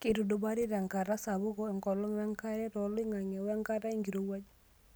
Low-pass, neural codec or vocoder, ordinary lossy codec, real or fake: none; none; none; real